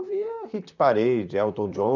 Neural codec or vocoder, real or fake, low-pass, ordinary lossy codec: vocoder, 44.1 kHz, 128 mel bands, Pupu-Vocoder; fake; 7.2 kHz; none